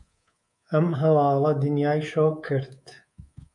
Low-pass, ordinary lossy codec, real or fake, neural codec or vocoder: 10.8 kHz; MP3, 64 kbps; fake; codec, 24 kHz, 3.1 kbps, DualCodec